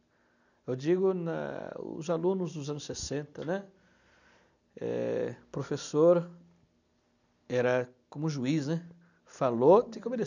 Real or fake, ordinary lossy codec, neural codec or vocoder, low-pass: real; none; none; 7.2 kHz